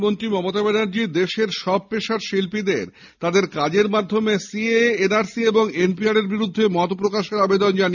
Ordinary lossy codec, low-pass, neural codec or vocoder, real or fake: none; 7.2 kHz; none; real